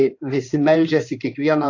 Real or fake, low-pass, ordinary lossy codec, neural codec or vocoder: fake; 7.2 kHz; AAC, 48 kbps; codec, 16 kHz in and 24 kHz out, 2.2 kbps, FireRedTTS-2 codec